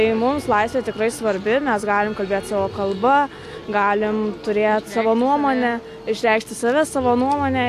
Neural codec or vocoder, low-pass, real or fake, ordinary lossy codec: none; 14.4 kHz; real; AAC, 64 kbps